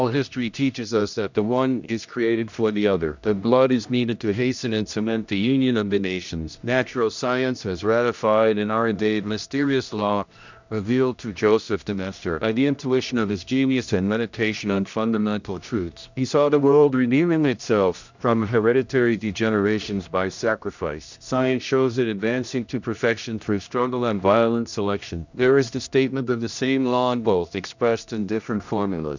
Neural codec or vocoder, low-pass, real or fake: codec, 16 kHz, 1 kbps, X-Codec, HuBERT features, trained on general audio; 7.2 kHz; fake